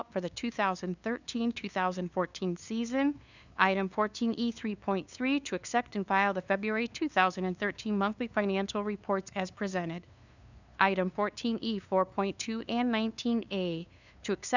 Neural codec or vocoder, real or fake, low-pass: codec, 16 kHz, 2 kbps, FunCodec, trained on LibriTTS, 25 frames a second; fake; 7.2 kHz